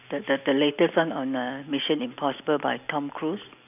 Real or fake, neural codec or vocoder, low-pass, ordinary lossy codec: real; none; 3.6 kHz; none